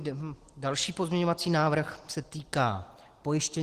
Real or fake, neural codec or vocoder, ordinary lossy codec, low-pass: real; none; Opus, 16 kbps; 14.4 kHz